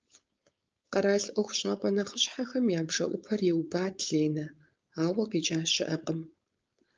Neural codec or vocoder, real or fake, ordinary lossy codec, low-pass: codec, 16 kHz, 4.8 kbps, FACodec; fake; Opus, 24 kbps; 7.2 kHz